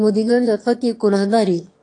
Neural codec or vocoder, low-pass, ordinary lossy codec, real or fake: autoencoder, 22.05 kHz, a latent of 192 numbers a frame, VITS, trained on one speaker; 9.9 kHz; AAC, 48 kbps; fake